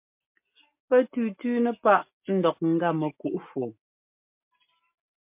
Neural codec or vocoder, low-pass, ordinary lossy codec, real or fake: none; 3.6 kHz; MP3, 32 kbps; real